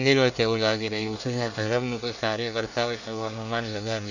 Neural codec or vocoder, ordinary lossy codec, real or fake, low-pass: codec, 24 kHz, 1 kbps, SNAC; none; fake; 7.2 kHz